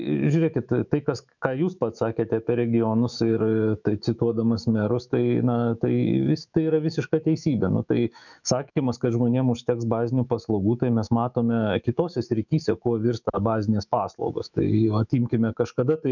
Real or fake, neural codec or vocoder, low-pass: fake; autoencoder, 48 kHz, 128 numbers a frame, DAC-VAE, trained on Japanese speech; 7.2 kHz